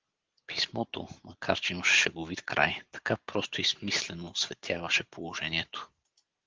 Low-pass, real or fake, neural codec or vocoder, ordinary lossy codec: 7.2 kHz; real; none; Opus, 16 kbps